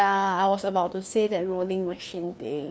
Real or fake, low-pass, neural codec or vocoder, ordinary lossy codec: fake; none; codec, 16 kHz, 2 kbps, FreqCodec, larger model; none